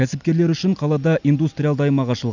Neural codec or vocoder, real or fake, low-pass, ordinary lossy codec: none; real; 7.2 kHz; none